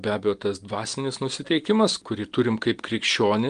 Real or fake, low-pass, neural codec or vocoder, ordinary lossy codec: real; 9.9 kHz; none; Opus, 24 kbps